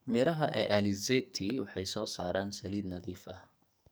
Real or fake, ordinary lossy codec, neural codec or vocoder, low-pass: fake; none; codec, 44.1 kHz, 2.6 kbps, SNAC; none